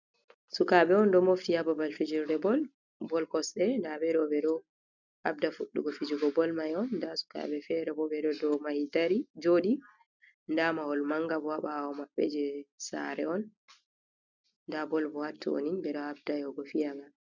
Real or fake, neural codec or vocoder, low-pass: real; none; 7.2 kHz